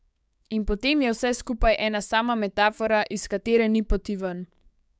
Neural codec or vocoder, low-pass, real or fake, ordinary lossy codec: codec, 16 kHz, 6 kbps, DAC; none; fake; none